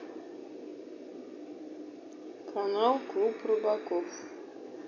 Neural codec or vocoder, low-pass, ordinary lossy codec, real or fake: none; 7.2 kHz; none; real